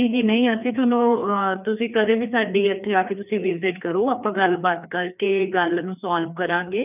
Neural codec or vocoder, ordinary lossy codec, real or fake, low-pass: codec, 16 kHz, 2 kbps, FreqCodec, larger model; none; fake; 3.6 kHz